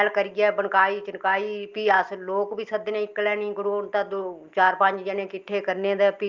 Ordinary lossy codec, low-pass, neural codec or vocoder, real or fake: Opus, 32 kbps; 7.2 kHz; none; real